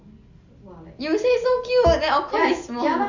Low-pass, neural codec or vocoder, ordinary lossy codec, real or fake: 7.2 kHz; none; none; real